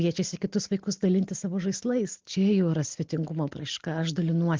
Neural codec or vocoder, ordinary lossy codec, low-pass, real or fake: none; Opus, 16 kbps; 7.2 kHz; real